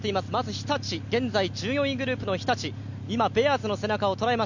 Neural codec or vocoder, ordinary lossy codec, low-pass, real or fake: none; none; 7.2 kHz; real